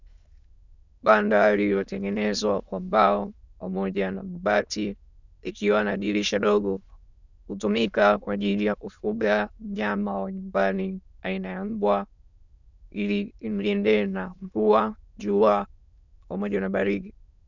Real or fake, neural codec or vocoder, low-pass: fake; autoencoder, 22.05 kHz, a latent of 192 numbers a frame, VITS, trained on many speakers; 7.2 kHz